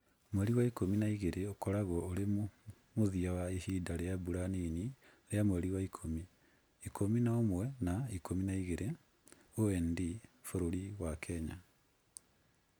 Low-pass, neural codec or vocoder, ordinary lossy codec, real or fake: none; none; none; real